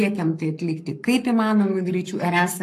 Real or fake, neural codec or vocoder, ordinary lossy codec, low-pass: fake; codec, 44.1 kHz, 7.8 kbps, Pupu-Codec; AAC, 64 kbps; 14.4 kHz